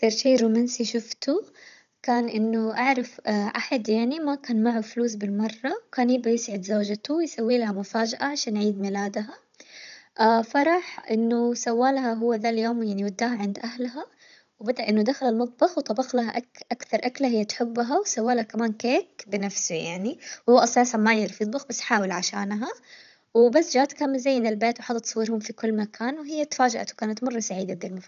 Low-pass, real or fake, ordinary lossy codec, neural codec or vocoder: 7.2 kHz; fake; none; codec, 16 kHz, 16 kbps, FunCodec, trained on Chinese and English, 50 frames a second